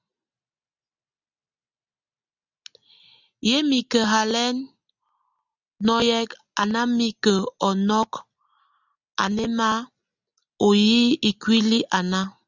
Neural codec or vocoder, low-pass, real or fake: none; 7.2 kHz; real